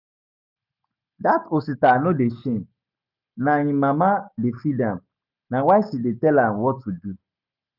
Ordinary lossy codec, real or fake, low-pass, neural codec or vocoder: none; real; 5.4 kHz; none